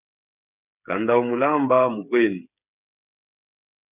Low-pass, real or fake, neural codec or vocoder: 3.6 kHz; fake; codec, 16 kHz, 8 kbps, FreqCodec, smaller model